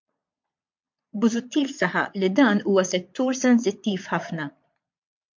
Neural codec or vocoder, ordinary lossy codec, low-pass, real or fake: vocoder, 22.05 kHz, 80 mel bands, Vocos; MP3, 48 kbps; 7.2 kHz; fake